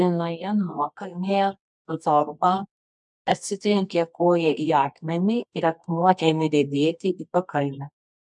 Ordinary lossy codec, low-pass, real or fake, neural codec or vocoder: MP3, 96 kbps; 10.8 kHz; fake; codec, 24 kHz, 0.9 kbps, WavTokenizer, medium music audio release